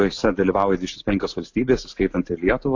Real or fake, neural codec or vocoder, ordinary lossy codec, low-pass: real; none; AAC, 48 kbps; 7.2 kHz